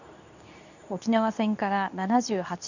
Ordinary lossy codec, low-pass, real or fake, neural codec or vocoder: none; 7.2 kHz; fake; codec, 24 kHz, 0.9 kbps, WavTokenizer, medium speech release version 2